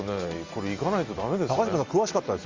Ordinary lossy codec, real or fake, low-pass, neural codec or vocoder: Opus, 32 kbps; real; 7.2 kHz; none